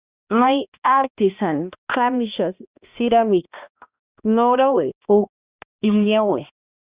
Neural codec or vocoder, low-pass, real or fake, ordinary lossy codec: codec, 16 kHz, 1 kbps, X-Codec, HuBERT features, trained on balanced general audio; 3.6 kHz; fake; Opus, 64 kbps